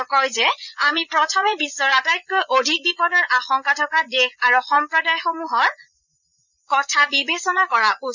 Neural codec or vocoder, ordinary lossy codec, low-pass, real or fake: vocoder, 44.1 kHz, 80 mel bands, Vocos; none; 7.2 kHz; fake